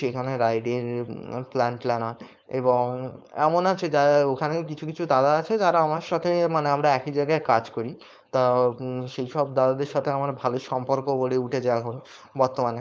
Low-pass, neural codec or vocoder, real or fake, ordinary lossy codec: none; codec, 16 kHz, 4.8 kbps, FACodec; fake; none